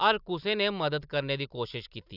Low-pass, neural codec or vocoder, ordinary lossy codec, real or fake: 5.4 kHz; none; none; real